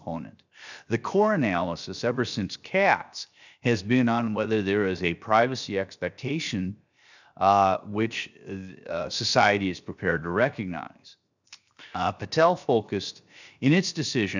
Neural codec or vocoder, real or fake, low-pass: codec, 16 kHz, 0.7 kbps, FocalCodec; fake; 7.2 kHz